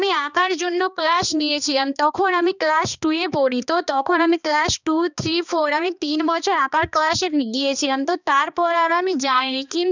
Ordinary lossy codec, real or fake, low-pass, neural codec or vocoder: none; fake; 7.2 kHz; codec, 16 kHz, 2 kbps, X-Codec, HuBERT features, trained on balanced general audio